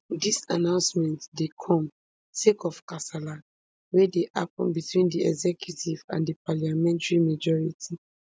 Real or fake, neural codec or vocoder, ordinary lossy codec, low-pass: real; none; none; none